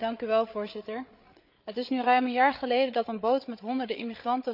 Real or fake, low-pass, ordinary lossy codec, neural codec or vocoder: fake; 5.4 kHz; none; codec, 16 kHz, 8 kbps, FreqCodec, larger model